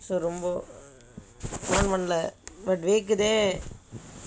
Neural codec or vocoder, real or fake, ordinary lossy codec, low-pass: none; real; none; none